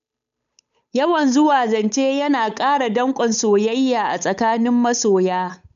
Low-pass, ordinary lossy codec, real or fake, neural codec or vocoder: 7.2 kHz; none; fake; codec, 16 kHz, 8 kbps, FunCodec, trained on Chinese and English, 25 frames a second